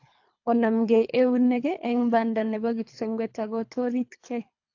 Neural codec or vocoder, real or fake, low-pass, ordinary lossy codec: codec, 24 kHz, 3 kbps, HILCodec; fake; 7.2 kHz; AAC, 48 kbps